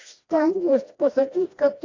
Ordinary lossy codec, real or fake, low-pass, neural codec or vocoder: AAC, 48 kbps; fake; 7.2 kHz; codec, 16 kHz, 1 kbps, FreqCodec, smaller model